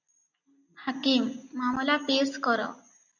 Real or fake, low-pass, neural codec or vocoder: real; 7.2 kHz; none